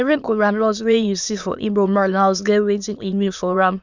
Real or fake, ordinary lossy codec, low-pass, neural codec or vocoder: fake; none; 7.2 kHz; autoencoder, 22.05 kHz, a latent of 192 numbers a frame, VITS, trained on many speakers